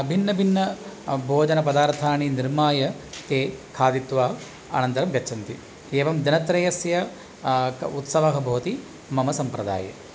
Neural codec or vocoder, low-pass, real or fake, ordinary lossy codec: none; none; real; none